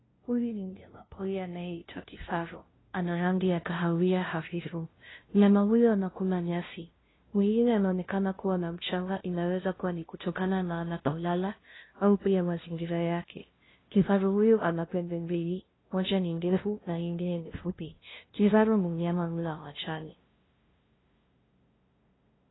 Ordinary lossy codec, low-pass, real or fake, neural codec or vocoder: AAC, 16 kbps; 7.2 kHz; fake; codec, 16 kHz, 0.5 kbps, FunCodec, trained on LibriTTS, 25 frames a second